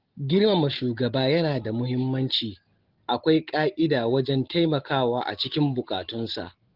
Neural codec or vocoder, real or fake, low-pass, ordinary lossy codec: none; real; 5.4 kHz; Opus, 16 kbps